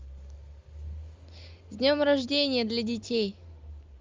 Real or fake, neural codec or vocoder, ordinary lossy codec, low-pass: real; none; Opus, 32 kbps; 7.2 kHz